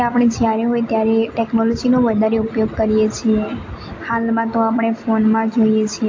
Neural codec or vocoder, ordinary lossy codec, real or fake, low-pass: none; AAC, 48 kbps; real; 7.2 kHz